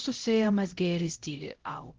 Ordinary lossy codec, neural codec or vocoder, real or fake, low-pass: Opus, 16 kbps; codec, 16 kHz, 0.5 kbps, X-Codec, HuBERT features, trained on LibriSpeech; fake; 7.2 kHz